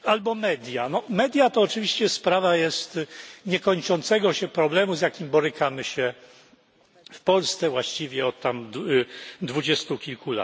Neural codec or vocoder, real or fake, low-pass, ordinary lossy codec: none; real; none; none